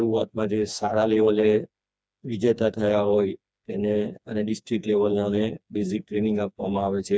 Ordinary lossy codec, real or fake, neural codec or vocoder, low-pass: none; fake; codec, 16 kHz, 2 kbps, FreqCodec, smaller model; none